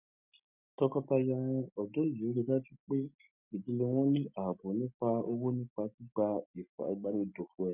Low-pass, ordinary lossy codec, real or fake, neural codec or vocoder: 3.6 kHz; none; real; none